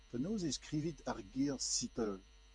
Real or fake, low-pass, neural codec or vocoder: fake; 10.8 kHz; vocoder, 48 kHz, 128 mel bands, Vocos